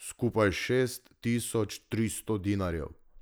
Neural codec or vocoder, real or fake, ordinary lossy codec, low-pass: none; real; none; none